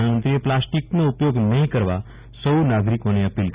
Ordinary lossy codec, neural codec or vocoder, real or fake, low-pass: Opus, 64 kbps; none; real; 3.6 kHz